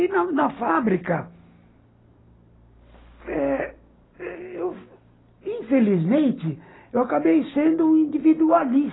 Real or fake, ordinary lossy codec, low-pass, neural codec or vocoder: real; AAC, 16 kbps; 7.2 kHz; none